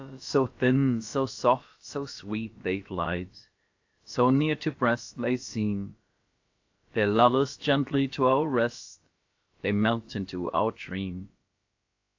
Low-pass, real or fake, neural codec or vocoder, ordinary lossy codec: 7.2 kHz; fake; codec, 16 kHz, about 1 kbps, DyCAST, with the encoder's durations; AAC, 48 kbps